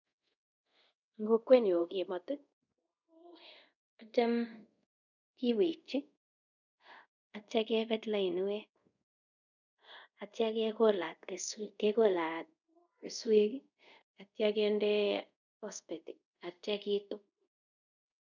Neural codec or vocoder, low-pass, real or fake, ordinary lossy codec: codec, 24 kHz, 0.5 kbps, DualCodec; 7.2 kHz; fake; none